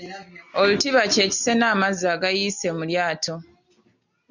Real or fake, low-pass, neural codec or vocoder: real; 7.2 kHz; none